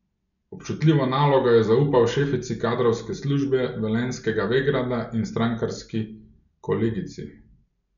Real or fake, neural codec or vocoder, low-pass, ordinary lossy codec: real; none; 7.2 kHz; none